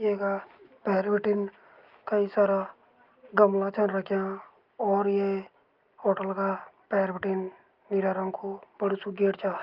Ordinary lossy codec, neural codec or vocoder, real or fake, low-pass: Opus, 32 kbps; none; real; 5.4 kHz